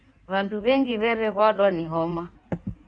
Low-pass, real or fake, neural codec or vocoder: 9.9 kHz; fake; codec, 16 kHz in and 24 kHz out, 1.1 kbps, FireRedTTS-2 codec